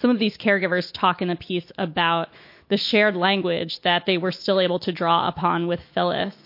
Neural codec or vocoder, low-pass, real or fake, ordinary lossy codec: autoencoder, 48 kHz, 128 numbers a frame, DAC-VAE, trained on Japanese speech; 5.4 kHz; fake; MP3, 32 kbps